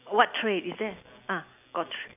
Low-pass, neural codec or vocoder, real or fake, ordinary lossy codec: 3.6 kHz; none; real; none